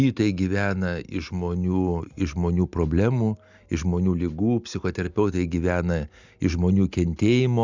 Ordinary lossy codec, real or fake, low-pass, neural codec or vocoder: Opus, 64 kbps; real; 7.2 kHz; none